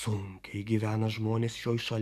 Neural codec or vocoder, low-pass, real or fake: codec, 44.1 kHz, 7.8 kbps, DAC; 14.4 kHz; fake